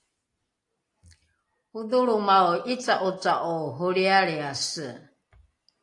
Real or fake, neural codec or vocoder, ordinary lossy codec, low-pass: real; none; AAC, 48 kbps; 10.8 kHz